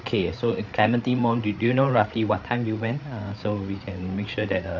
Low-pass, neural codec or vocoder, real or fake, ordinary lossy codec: 7.2 kHz; codec, 16 kHz, 8 kbps, FreqCodec, larger model; fake; none